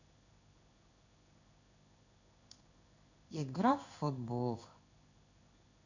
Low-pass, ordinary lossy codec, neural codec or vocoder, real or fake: 7.2 kHz; none; codec, 16 kHz in and 24 kHz out, 1 kbps, XY-Tokenizer; fake